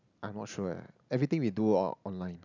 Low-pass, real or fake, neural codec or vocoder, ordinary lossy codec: 7.2 kHz; real; none; none